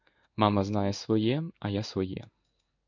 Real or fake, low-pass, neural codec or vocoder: fake; 7.2 kHz; codec, 16 kHz in and 24 kHz out, 1 kbps, XY-Tokenizer